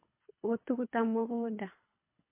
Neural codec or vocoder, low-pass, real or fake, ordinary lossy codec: codec, 24 kHz, 3 kbps, HILCodec; 3.6 kHz; fake; MP3, 24 kbps